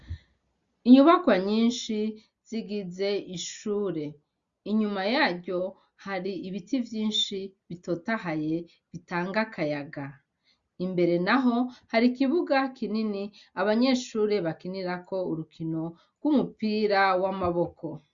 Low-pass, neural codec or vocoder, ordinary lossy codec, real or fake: 7.2 kHz; none; Opus, 64 kbps; real